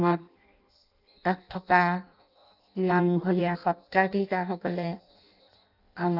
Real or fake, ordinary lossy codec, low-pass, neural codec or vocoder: fake; none; 5.4 kHz; codec, 16 kHz in and 24 kHz out, 0.6 kbps, FireRedTTS-2 codec